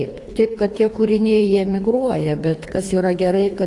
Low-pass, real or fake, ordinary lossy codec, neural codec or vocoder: 10.8 kHz; fake; AAC, 48 kbps; codec, 24 kHz, 3 kbps, HILCodec